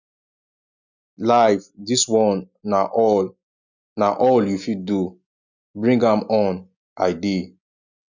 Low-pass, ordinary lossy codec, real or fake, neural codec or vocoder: 7.2 kHz; none; real; none